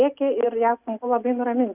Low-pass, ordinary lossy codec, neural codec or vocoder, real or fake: 3.6 kHz; Opus, 64 kbps; none; real